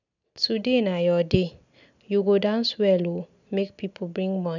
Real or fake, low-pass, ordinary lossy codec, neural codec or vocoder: real; 7.2 kHz; none; none